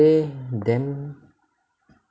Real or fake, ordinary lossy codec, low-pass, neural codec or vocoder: real; none; none; none